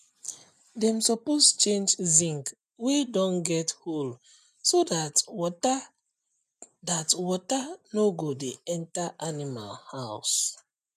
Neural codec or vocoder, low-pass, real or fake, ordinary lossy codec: none; 14.4 kHz; real; none